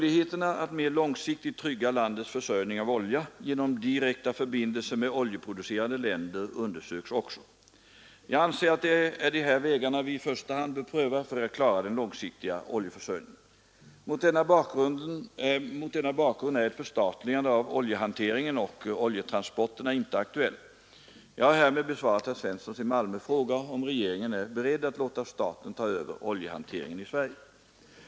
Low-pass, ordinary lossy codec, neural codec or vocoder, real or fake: none; none; none; real